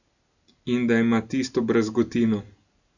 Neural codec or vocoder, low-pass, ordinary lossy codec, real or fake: none; 7.2 kHz; none; real